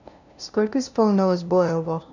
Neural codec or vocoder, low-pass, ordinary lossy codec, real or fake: codec, 16 kHz, 0.5 kbps, FunCodec, trained on LibriTTS, 25 frames a second; 7.2 kHz; none; fake